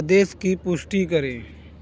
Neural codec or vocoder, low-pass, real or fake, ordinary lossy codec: none; none; real; none